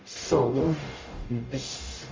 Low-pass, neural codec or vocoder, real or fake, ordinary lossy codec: 7.2 kHz; codec, 44.1 kHz, 0.9 kbps, DAC; fake; Opus, 32 kbps